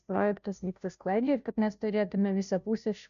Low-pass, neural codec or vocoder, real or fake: 7.2 kHz; codec, 16 kHz, 0.5 kbps, FunCodec, trained on Chinese and English, 25 frames a second; fake